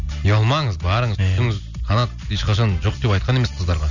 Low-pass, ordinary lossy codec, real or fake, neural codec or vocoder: 7.2 kHz; none; real; none